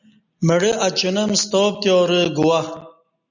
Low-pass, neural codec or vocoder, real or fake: 7.2 kHz; none; real